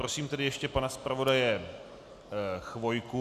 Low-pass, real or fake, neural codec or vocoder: 14.4 kHz; real; none